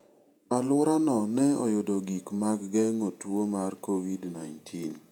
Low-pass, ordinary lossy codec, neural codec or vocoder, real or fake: 19.8 kHz; none; vocoder, 48 kHz, 128 mel bands, Vocos; fake